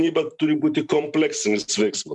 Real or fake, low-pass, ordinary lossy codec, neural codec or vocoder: fake; 10.8 kHz; MP3, 96 kbps; vocoder, 44.1 kHz, 128 mel bands every 512 samples, BigVGAN v2